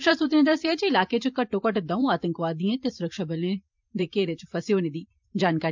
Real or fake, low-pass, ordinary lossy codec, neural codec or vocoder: fake; 7.2 kHz; MP3, 64 kbps; vocoder, 44.1 kHz, 128 mel bands every 512 samples, BigVGAN v2